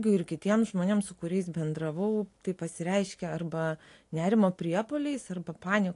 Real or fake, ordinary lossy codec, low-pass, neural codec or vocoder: real; AAC, 48 kbps; 10.8 kHz; none